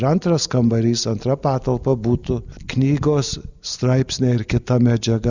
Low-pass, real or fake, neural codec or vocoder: 7.2 kHz; real; none